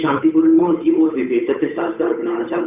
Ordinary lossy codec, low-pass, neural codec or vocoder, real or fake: none; 3.6 kHz; codec, 16 kHz, 8 kbps, FunCodec, trained on Chinese and English, 25 frames a second; fake